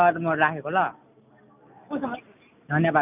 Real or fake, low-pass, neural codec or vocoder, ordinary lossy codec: real; 3.6 kHz; none; none